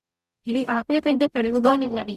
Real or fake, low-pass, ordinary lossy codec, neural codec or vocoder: fake; 14.4 kHz; Opus, 64 kbps; codec, 44.1 kHz, 0.9 kbps, DAC